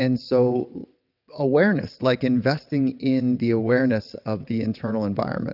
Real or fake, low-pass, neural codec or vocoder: fake; 5.4 kHz; vocoder, 22.05 kHz, 80 mel bands, WaveNeXt